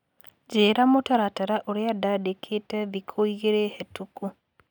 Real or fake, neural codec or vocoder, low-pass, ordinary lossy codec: real; none; 19.8 kHz; none